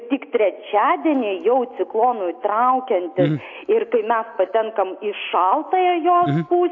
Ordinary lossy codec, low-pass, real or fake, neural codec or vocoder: AAC, 48 kbps; 7.2 kHz; real; none